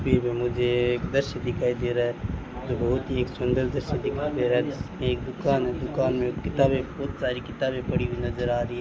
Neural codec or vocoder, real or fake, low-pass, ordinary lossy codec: none; real; none; none